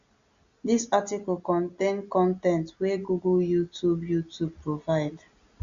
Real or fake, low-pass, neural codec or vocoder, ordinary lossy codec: real; 7.2 kHz; none; Opus, 64 kbps